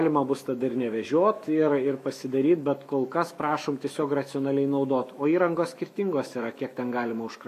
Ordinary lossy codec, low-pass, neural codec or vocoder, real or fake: MP3, 64 kbps; 14.4 kHz; autoencoder, 48 kHz, 128 numbers a frame, DAC-VAE, trained on Japanese speech; fake